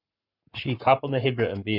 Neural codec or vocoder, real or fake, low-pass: codec, 44.1 kHz, 7.8 kbps, Pupu-Codec; fake; 5.4 kHz